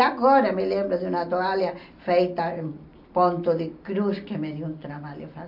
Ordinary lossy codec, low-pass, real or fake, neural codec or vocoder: none; 5.4 kHz; real; none